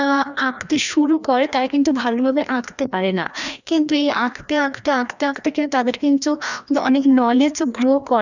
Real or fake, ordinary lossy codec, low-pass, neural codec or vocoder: fake; none; 7.2 kHz; codec, 16 kHz, 1 kbps, FreqCodec, larger model